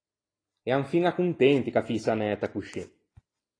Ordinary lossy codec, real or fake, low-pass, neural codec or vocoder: AAC, 32 kbps; real; 9.9 kHz; none